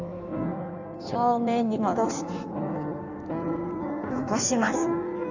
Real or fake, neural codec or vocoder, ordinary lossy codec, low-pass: fake; codec, 16 kHz in and 24 kHz out, 1.1 kbps, FireRedTTS-2 codec; none; 7.2 kHz